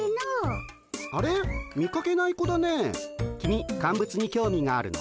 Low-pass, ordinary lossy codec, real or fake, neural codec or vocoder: none; none; real; none